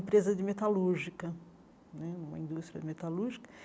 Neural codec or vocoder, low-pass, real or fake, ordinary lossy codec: none; none; real; none